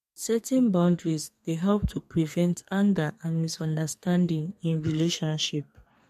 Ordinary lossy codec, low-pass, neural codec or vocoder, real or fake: MP3, 64 kbps; 14.4 kHz; codec, 32 kHz, 1.9 kbps, SNAC; fake